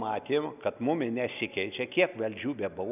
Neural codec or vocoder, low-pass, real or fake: none; 3.6 kHz; real